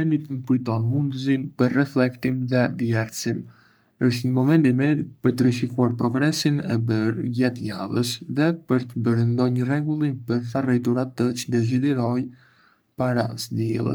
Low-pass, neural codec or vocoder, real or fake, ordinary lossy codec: none; codec, 44.1 kHz, 3.4 kbps, Pupu-Codec; fake; none